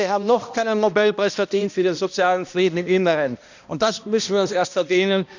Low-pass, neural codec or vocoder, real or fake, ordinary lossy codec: 7.2 kHz; codec, 16 kHz, 1 kbps, X-Codec, HuBERT features, trained on balanced general audio; fake; none